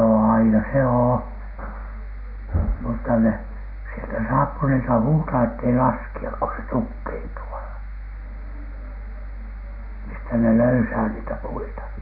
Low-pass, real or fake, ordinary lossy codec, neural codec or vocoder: 5.4 kHz; real; none; none